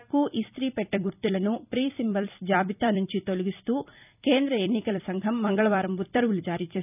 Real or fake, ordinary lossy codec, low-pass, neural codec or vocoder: fake; none; 3.6 kHz; vocoder, 44.1 kHz, 128 mel bands every 256 samples, BigVGAN v2